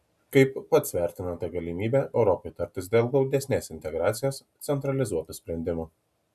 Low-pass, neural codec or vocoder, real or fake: 14.4 kHz; none; real